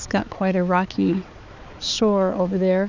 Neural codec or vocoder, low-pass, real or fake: codec, 16 kHz, 4 kbps, X-Codec, HuBERT features, trained on balanced general audio; 7.2 kHz; fake